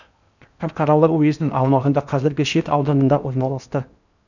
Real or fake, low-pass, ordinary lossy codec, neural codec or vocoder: fake; 7.2 kHz; none; codec, 16 kHz in and 24 kHz out, 0.8 kbps, FocalCodec, streaming, 65536 codes